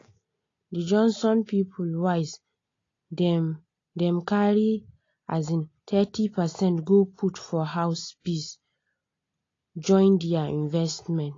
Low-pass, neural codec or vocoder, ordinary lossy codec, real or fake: 7.2 kHz; none; AAC, 32 kbps; real